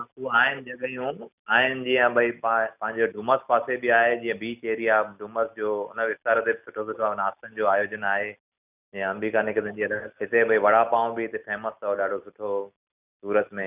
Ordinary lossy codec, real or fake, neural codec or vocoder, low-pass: none; real; none; 3.6 kHz